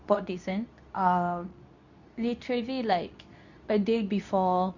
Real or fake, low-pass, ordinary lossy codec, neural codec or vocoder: fake; 7.2 kHz; none; codec, 24 kHz, 0.9 kbps, WavTokenizer, medium speech release version 2